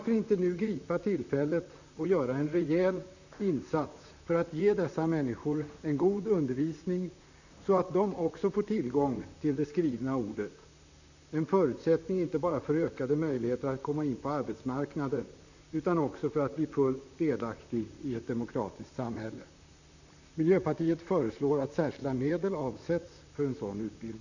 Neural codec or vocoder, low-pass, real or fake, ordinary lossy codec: vocoder, 44.1 kHz, 128 mel bands, Pupu-Vocoder; 7.2 kHz; fake; none